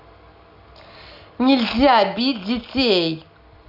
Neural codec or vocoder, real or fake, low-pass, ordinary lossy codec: none; real; 5.4 kHz; none